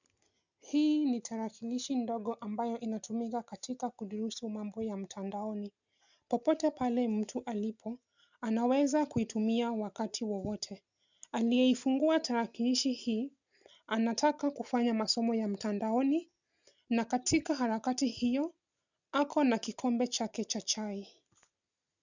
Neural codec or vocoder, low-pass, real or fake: none; 7.2 kHz; real